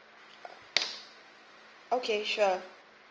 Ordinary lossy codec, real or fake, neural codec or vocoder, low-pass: Opus, 24 kbps; real; none; 7.2 kHz